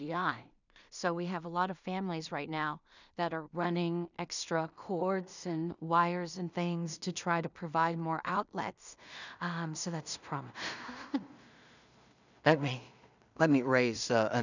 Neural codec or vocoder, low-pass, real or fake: codec, 16 kHz in and 24 kHz out, 0.4 kbps, LongCat-Audio-Codec, two codebook decoder; 7.2 kHz; fake